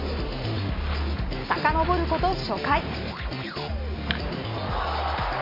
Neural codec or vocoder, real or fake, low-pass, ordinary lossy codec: none; real; 5.4 kHz; MP3, 24 kbps